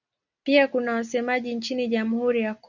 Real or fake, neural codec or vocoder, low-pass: real; none; 7.2 kHz